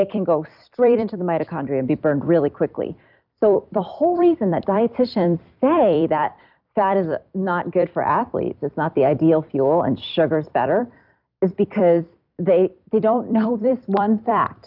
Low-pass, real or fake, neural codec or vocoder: 5.4 kHz; fake; vocoder, 44.1 kHz, 128 mel bands every 256 samples, BigVGAN v2